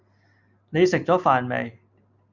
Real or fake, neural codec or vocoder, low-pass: real; none; 7.2 kHz